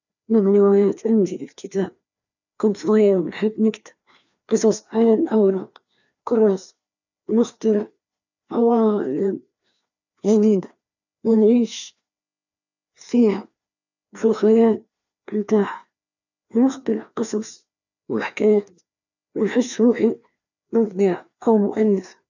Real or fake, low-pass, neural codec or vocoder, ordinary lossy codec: fake; 7.2 kHz; codec, 16 kHz, 2 kbps, FreqCodec, larger model; none